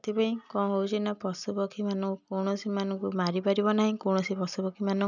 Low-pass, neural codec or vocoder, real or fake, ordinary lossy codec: 7.2 kHz; none; real; none